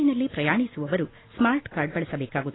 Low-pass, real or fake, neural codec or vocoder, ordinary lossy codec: 7.2 kHz; real; none; AAC, 16 kbps